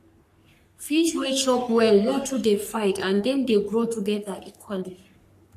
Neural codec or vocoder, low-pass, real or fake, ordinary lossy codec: codec, 44.1 kHz, 3.4 kbps, Pupu-Codec; 14.4 kHz; fake; none